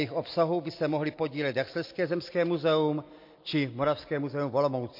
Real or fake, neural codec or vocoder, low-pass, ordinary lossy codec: real; none; 5.4 kHz; MP3, 32 kbps